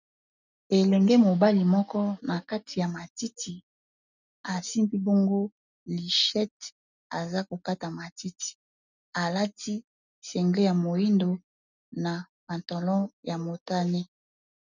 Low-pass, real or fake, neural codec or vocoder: 7.2 kHz; real; none